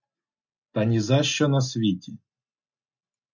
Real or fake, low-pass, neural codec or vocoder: real; 7.2 kHz; none